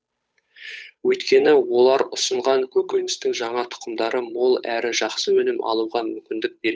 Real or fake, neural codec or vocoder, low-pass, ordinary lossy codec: fake; codec, 16 kHz, 8 kbps, FunCodec, trained on Chinese and English, 25 frames a second; none; none